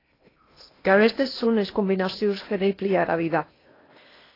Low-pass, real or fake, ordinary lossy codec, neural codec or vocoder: 5.4 kHz; fake; AAC, 24 kbps; codec, 16 kHz in and 24 kHz out, 0.8 kbps, FocalCodec, streaming, 65536 codes